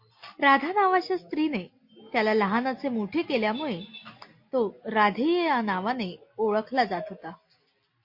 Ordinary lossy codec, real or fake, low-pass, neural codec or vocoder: MP3, 32 kbps; real; 5.4 kHz; none